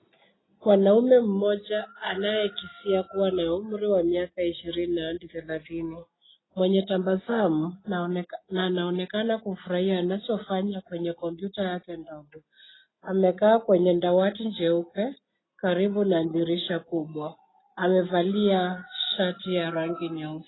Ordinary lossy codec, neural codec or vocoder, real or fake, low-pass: AAC, 16 kbps; none; real; 7.2 kHz